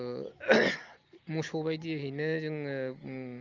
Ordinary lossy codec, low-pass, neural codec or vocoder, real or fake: Opus, 24 kbps; 7.2 kHz; none; real